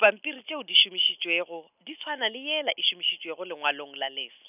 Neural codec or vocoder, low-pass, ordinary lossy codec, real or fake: none; 3.6 kHz; none; real